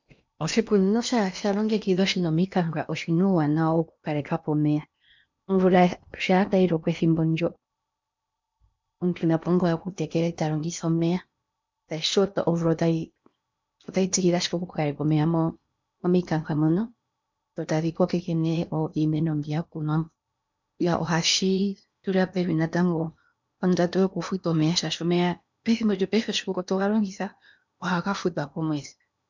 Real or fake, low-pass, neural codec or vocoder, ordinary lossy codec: fake; 7.2 kHz; codec, 16 kHz in and 24 kHz out, 0.8 kbps, FocalCodec, streaming, 65536 codes; MP3, 64 kbps